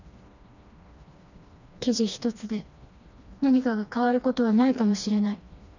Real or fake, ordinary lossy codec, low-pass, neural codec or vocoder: fake; none; 7.2 kHz; codec, 16 kHz, 2 kbps, FreqCodec, smaller model